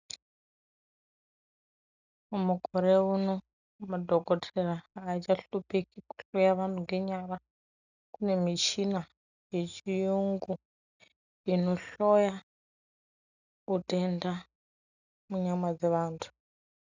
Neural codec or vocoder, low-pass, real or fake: none; 7.2 kHz; real